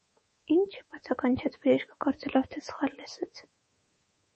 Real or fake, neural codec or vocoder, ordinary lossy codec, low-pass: fake; codec, 24 kHz, 3.1 kbps, DualCodec; MP3, 32 kbps; 10.8 kHz